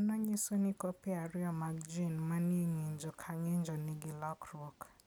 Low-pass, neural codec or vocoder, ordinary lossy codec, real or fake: none; none; none; real